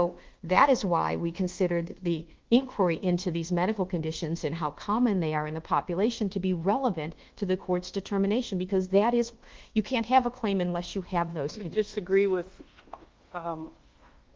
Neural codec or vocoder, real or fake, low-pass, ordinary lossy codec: codec, 24 kHz, 1.2 kbps, DualCodec; fake; 7.2 kHz; Opus, 16 kbps